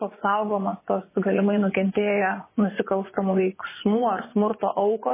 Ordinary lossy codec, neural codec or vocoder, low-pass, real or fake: MP3, 16 kbps; vocoder, 24 kHz, 100 mel bands, Vocos; 3.6 kHz; fake